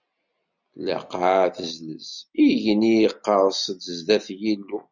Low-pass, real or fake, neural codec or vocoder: 7.2 kHz; real; none